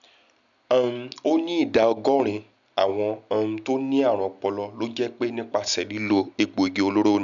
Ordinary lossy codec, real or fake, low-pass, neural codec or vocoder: MP3, 96 kbps; real; 7.2 kHz; none